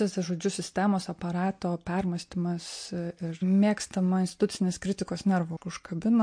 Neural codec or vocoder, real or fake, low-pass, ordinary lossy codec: none; real; 9.9 kHz; MP3, 48 kbps